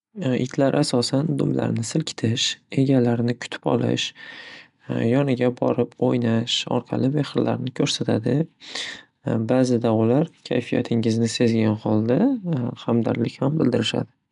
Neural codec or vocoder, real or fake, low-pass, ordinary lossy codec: none; real; 10.8 kHz; none